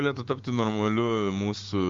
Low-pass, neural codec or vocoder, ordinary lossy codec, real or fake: 7.2 kHz; codec, 16 kHz, 6 kbps, DAC; Opus, 32 kbps; fake